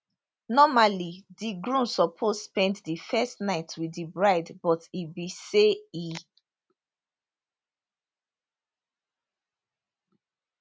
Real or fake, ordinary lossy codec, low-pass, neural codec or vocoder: real; none; none; none